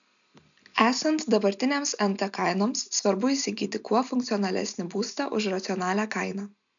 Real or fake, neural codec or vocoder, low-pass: real; none; 7.2 kHz